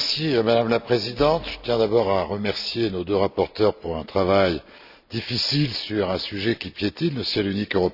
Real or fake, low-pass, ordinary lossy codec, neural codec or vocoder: real; 5.4 kHz; AAC, 48 kbps; none